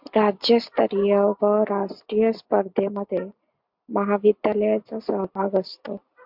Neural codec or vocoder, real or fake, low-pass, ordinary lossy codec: none; real; 5.4 kHz; MP3, 48 kbps